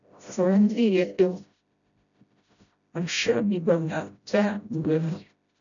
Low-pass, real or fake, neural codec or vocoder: 7.2 kHz; fake; codec, 16 kHz, 0.5 kbps, FreqCodec, smaller model